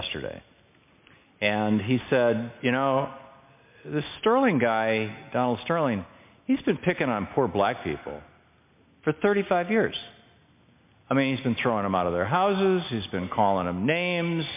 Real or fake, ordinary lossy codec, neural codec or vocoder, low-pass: real; MP3, 32 kbps; none; 3.6 kHz